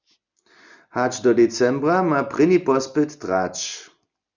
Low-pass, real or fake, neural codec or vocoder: 7.2 kHz; real; none